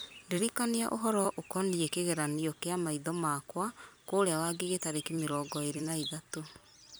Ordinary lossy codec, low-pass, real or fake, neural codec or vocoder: none; none; fake; vocoder, 44.1 kHz, 128 mel bands every 256 samples, BigVGAN v2